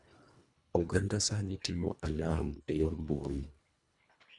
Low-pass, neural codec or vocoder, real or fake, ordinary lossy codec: 10.8 kHz; codec, 24 kHz, 1.5 kbps, HILCodec; fake; none